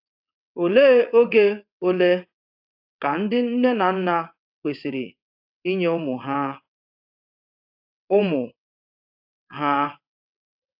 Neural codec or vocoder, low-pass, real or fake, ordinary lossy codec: vocoder, 22.05 kHz, 80 mel bands, WaveNeXt; 5.4 kHz; fake; AAC, 48 kbps